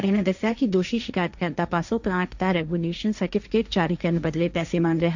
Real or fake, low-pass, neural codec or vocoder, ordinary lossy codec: fake; 7.2 kHz; codec, 16 kHz, 1.1 kbps, Voila-Tokenizer; none